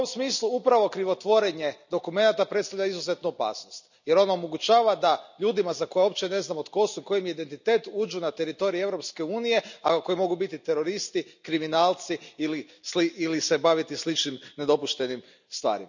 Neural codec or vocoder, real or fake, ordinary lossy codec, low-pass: none; real; none; 7.2 kHz